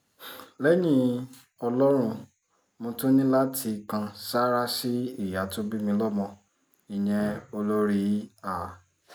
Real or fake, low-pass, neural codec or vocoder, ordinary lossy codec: real; none; none; none